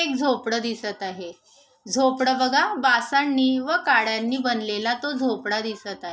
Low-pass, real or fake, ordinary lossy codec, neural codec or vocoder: none; real; none; none